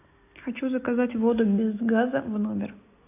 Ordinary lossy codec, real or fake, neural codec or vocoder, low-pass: AAC, 24 kbps; real; none; 3.6 kHz